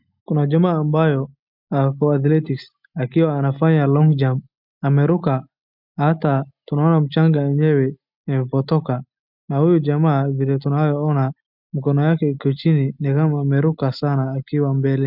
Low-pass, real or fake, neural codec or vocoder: 5.4 kHz; real; none